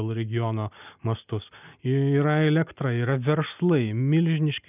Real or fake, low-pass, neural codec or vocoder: real; 3.6 kHz; none